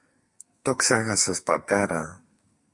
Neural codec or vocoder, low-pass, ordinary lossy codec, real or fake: codec, 44.1 kHz, 2.6 kbps, SNAC; 10.8 kHz; MP3, 48 kbps; fake